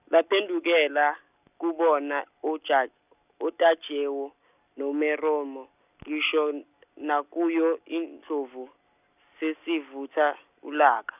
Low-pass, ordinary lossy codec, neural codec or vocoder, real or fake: 3.6 kHz; none; none; real